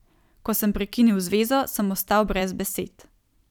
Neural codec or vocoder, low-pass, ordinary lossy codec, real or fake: vocoder, 44.1 kHz, 128 mel bands every 256 samples, BigVGAN v2; 19.8 kHz; none; fake